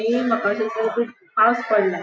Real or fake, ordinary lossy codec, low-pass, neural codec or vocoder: real; none; none; none